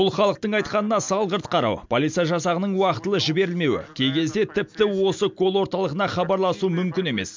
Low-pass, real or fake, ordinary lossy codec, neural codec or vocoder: 7.2 kHz; real; MP3, 64 kbps; none